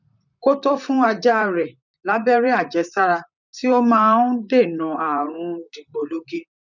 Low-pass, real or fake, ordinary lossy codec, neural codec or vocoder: 7.2 kHz; fake; none; vocoder, 44.1 kHz, 128 mel bands, Pupu-Vocoder